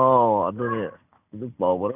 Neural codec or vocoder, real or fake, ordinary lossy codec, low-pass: none; real; none; 3.6 kHz